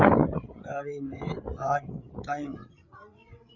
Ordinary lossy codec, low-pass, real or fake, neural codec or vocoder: Opus, 64 kbps; 7.2 kHz; fake; codec, 16 kHz, 8 kbps, FreqCodec, larger model